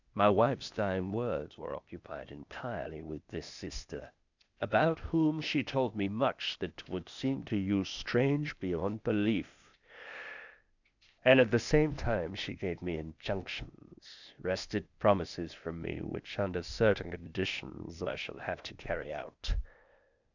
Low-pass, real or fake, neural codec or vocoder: 7.2 kHz; fake; codec, 16 kHz, 0.8 kbps, ZipCodec